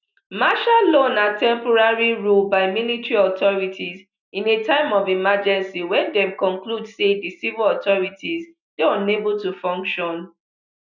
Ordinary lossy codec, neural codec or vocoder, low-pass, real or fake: none; none; 7.2 kHz; real